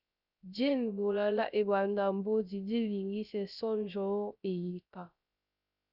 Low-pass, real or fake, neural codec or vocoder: 5.4 kHz; fake; codec, 16 kHz, 0.3 kbps, FocalCodec